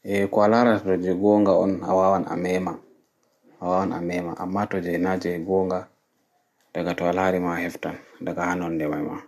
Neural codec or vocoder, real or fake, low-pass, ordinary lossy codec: none; real; 14.4 kHz; MP3, 64 kbps